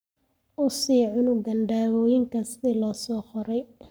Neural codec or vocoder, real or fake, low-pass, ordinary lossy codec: codec, 44.1 kHz, 7.8 kbps, Pupu-Codec; fake; none; none